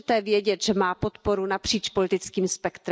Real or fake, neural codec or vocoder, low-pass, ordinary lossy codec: real; none; none; none